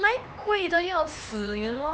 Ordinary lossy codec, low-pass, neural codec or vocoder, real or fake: none; none; codec, 16 kHz, 4 kbps, X-Codec, HuBERT features, trained on LibriSpeech; fake